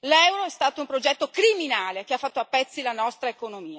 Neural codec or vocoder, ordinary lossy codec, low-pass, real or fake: none; none; none; real